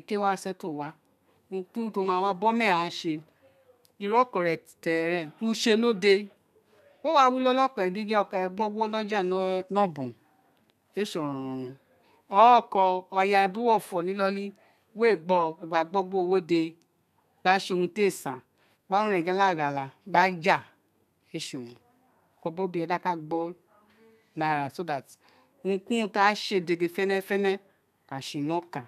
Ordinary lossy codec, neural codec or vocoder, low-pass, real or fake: none; codec, 32 kHz, 1.9 kbps, SNAC; 14.4 kHz; fake